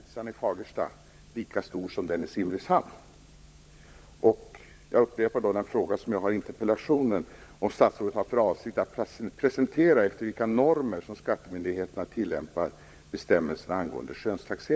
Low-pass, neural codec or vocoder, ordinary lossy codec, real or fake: none; codec, 16 kHz, 16 kbps, FunCodec, trained on LibriTTS, 50 frames a second; none; fake